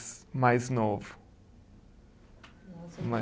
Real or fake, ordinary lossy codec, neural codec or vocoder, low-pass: real; none; none; none